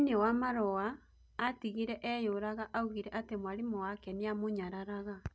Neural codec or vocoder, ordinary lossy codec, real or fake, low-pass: none; none; real; none